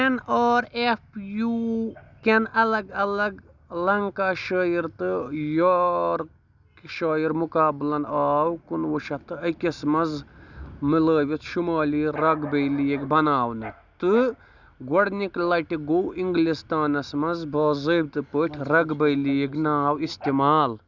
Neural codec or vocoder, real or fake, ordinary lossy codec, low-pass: none; real; none; 7.2 kHz